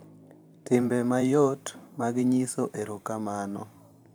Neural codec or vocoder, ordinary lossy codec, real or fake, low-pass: vocoder, 44.1 kHz, 128 mel bands every 512 samples, BigVGAN v2; none; fake; none